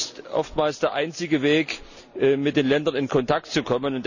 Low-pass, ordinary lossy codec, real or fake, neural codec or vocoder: 7.2 kHz; none; real; none